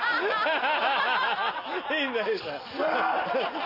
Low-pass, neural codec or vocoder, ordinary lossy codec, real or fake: 5.4 kHz; none; none; real